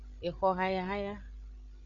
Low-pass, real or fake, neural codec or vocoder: 7.2 kHz; fake; codec, 16 kHz, 8 kbps, FreqCodec, larger model